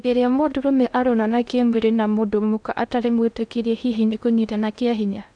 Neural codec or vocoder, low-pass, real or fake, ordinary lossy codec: codec, 16 kHz in and 24 kHz out, 0.6 kbps, FocalCodec, streaming, 2048 codes; 9.9 kHz; fake; none